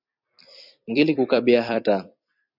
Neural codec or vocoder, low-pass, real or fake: vocoder, 44.1 kHz, 128 mel bands every 256 samples, BigVGAN v2; 5.4 kHz; fake